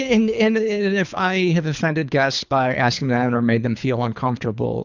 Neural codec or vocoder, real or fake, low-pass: codec, 24 kHz, 3 kbps, HILCodec; fake; 7.2 kHz